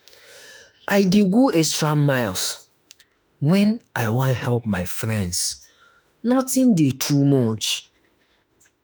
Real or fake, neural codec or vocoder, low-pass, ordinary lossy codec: fake; autoencoder, 48 kHz, 32 numbers a frame, DAC-VAE, trained on Japanese speech; none; none